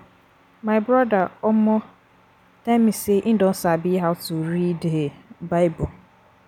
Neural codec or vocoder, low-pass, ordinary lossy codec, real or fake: none; 19.8 kHz; none; real